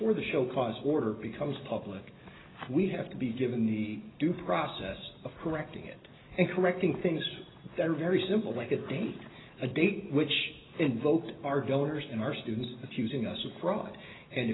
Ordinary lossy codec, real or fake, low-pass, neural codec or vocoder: AAC, 16 kbps; real; 7.2 kHz; none